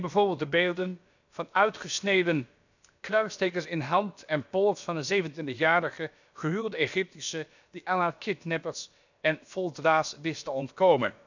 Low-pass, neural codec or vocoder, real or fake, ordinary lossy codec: 7.2 kHz; codec, 16 kHz, about 1 kbps, DyCAST, with the encoder's durations; fake; none